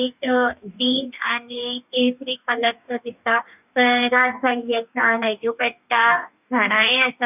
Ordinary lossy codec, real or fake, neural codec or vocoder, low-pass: none; fake; codec, 44.1 kHz, 2.6 kbps, DAC; 3.6 kHz